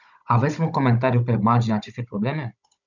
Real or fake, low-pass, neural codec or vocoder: fake; 7.2 kHz; codec, 16 kHz, 16 kbps, FunCodec, trained on Chinese and English, 50 frames a second